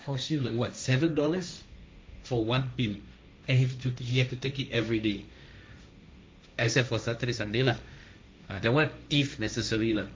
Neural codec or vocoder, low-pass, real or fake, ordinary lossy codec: codec, 16 kHz, 1.1 kbps, Voila-Tokenizer; none; fake; none